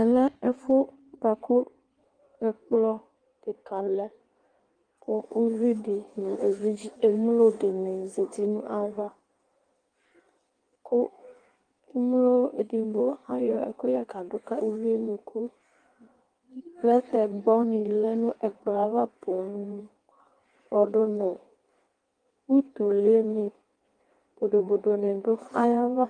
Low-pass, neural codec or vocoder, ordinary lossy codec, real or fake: 9.9 kHz; codec, 16 kHz in and 24 kHz out, 1.1 kbps, FireRedTTS-2 codec; Opus, 24 kbps; fake